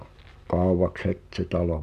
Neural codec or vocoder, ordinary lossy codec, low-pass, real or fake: none; none; 14.4 kHz; real